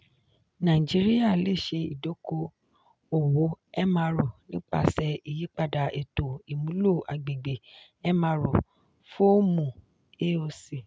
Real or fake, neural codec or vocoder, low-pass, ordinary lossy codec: real; none; none; none